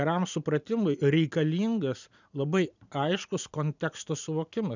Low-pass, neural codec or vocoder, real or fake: 7.2 kHz; none; real